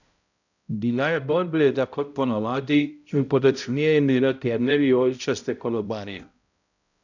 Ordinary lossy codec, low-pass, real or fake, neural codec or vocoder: none; 7.2 kHz; fake; codec, 16 kHz, 0.5 kbps, X-Codec, HuBERT features, trained on balanced general audio